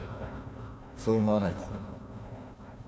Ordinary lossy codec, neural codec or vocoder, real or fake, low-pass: none; codec, 16 kHz, 1 kbps, FunCodec, trained on Chinese and English, 50 frames a second; fake; none